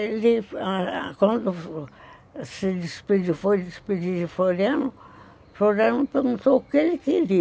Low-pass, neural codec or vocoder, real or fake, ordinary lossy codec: none; none; real; none